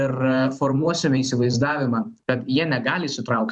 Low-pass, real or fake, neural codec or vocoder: 10.8 kHz; fake; vocoder, 44.1 kHz, 128 mel bands every 512 samples, BigVGAN v2